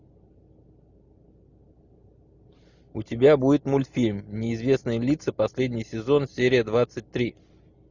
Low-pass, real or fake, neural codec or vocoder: 7.2 kHz; real; none